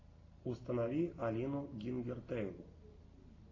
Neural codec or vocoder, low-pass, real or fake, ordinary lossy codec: none; 7.2 kHz; real; AAC, 32 kbps